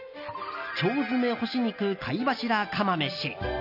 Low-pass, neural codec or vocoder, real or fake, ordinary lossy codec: 5.4 kHz; none; real; none